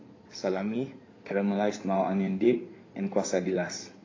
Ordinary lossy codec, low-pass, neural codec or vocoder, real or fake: AAC, 32 kbps; 7.2 kHz; codec, 16 kHz in and 24 kHz out, 2.2 kbps, FireRedTTS-2 codec; fake